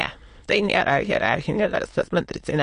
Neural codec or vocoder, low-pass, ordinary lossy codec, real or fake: autoencoder, 22.05 kHz, a latent of 192 numbers a frame, VITS, trained on many speakers; 9.9 kHz; MP3, 48 kbps; fake